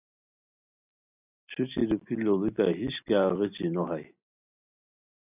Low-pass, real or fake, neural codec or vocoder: 3.6 kHz; real; none